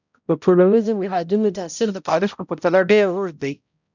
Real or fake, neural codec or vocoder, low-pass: fake; codec, 16 kHz, 0.5 kbps, X-Codec, HuBERT features, trained on balanced general audio; 7.2 kHz